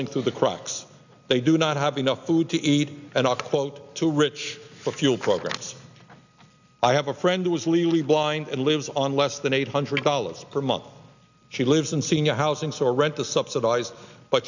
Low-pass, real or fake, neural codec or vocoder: 7.2 kHz; real; none